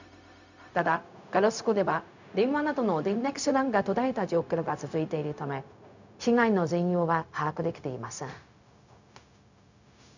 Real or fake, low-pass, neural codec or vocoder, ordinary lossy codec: fake; 7.2 kHz; codec, 16 kHz, 0.4 kbps, LongCat-Audio-Codec; none